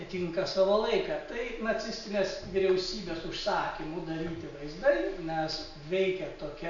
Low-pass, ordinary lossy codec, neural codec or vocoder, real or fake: 7.2 kHz; AAC, 64 kbps; none; real